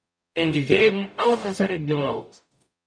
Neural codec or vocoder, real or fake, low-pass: codec, 44.1 kHz, 0.9 kbps, DAC; fake; 9.9 kHz